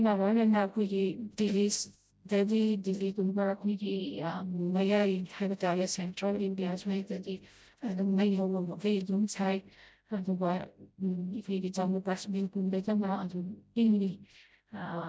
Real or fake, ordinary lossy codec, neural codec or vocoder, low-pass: fake; none; codec, 16 kHz, 0.5 kbps, FreqCodec, smaller model; none